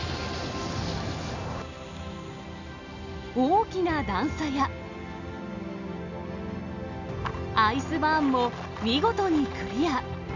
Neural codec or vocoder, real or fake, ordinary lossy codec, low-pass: none; real; none; 7.2 kHz